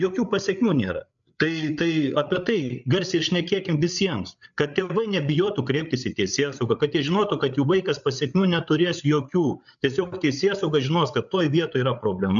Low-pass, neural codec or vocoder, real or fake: 7.2 kHz; codec, 16 kHz, 8 kbps, FreqCodec, larger model; fake